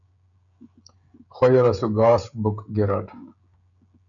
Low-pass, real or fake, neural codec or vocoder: 7.2 kHz; fake; codec, 16 kHz, 16 kbps, FreqCodec, smaller model